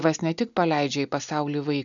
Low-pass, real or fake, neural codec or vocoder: 7.2 kHz; real; none